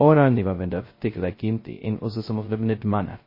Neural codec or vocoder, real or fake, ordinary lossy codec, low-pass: codec, 16 kHz, 0.2 kbps, FocalCodec; fake; MP3, 24 kbps; 5.4 kHz